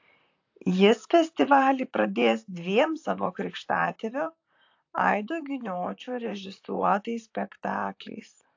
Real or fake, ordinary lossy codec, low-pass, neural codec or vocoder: fake; AAC, 48 kbps; 7.2 kHz; vocoder, 44.1 kHz, 128 mel bands, Pupu-Vocoder